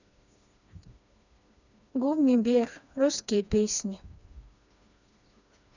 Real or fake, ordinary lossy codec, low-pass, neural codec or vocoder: fake; none; 7.2 kHz; codec, 16 kHz, 2 kbps, FreqCodec, smaller model